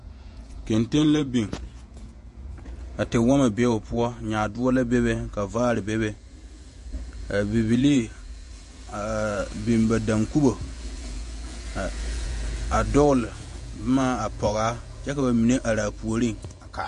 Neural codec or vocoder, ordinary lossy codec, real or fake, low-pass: vocoder, 48 kHz, 128 mel bands, Vocos; MP3, 48 kbps; fake; 14.4 kHz